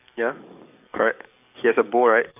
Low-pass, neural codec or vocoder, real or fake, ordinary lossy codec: 3.6 kHz; codec, 44.1 kHz, 7.8 kbps, DAC; fake; none